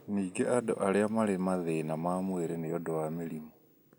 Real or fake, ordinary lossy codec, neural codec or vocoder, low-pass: real; none; none; none